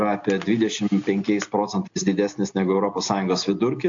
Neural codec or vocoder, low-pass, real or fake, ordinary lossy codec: none; 7.2 kHz; real; AAC, 64 kbps